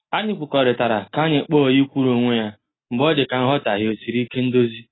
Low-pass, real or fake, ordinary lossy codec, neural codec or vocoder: 7.2 kHz; real; AAC, 16 kbps; none